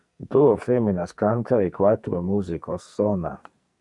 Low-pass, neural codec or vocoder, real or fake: 10.8 kHz; codec, 44.1 kHz, 2.6 kbps, SNAC; fake